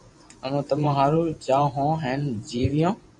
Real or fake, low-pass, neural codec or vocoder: fake; 10.8 kHz; vocoder, 24 kHz, 100 mel bands, Vocos